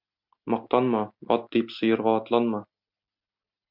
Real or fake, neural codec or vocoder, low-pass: real; none; 5.4 kHz